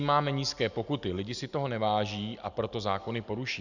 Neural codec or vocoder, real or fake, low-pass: none; real; 7.2 kHz